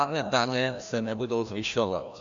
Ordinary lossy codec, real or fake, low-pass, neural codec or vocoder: AAC, 64 kbps; fake; 7.2 kHz; codec, 16 kHz, 1 kbps, FreqCodec, larger model